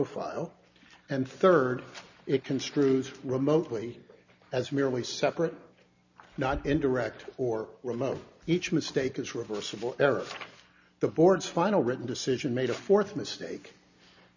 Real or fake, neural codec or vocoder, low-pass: real; none; 7.2 kHz